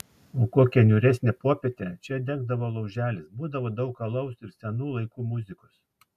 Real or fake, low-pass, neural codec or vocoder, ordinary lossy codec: real; 14.4 kHz; none; MP3, 96 kbps